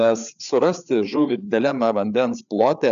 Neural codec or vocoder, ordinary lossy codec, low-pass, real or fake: codec, 16 kHz, 4 kbps, FreqCodec, larger model; MP3, 96 kbps; 7.2 kHz; fake